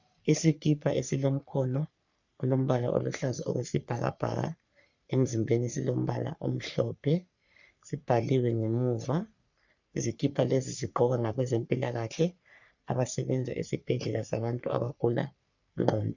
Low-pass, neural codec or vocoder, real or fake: 7.2 kHz; codec, 44.1 kHz, 3.4 kbps, Pupu-Codec; fake